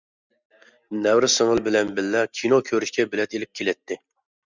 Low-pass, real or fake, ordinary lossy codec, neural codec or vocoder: 7.2 kHz; real; Opus, 64 kbps; none